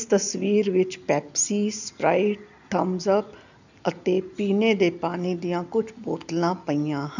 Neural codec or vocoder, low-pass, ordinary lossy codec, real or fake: none; 7.2 kHz; none; real